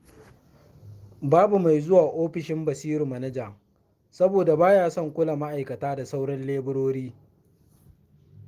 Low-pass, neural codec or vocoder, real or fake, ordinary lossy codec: 14.4 kHz; none; real; Opus, 24 kbps